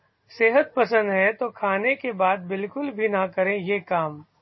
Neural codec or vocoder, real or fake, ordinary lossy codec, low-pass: none; real; MP3, 24 kbps; 7.2 kHz